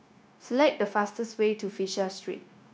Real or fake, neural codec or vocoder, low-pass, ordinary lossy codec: fake; codec, 16 kHz, 0.9 kbps, LongCat-Audio-Codec; none; none